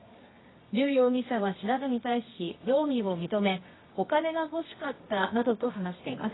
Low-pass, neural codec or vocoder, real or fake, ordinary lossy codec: 7.2 kHz; codec, 24 kHz, 0.9 kbps, WavTokenizer, medium music audio release; fake; AAC, 16 kbps